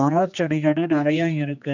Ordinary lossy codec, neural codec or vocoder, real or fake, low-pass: none; codec, 16 kHz, 2 kbps, X-Codec, HuBERT features, trained on general audio; fake; 7.2 kHz